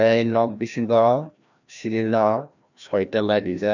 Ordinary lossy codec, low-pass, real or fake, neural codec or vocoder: none; 7.2 kHz; fake; codec, 16 kHz, 1 kbps, FreqCodec, larger model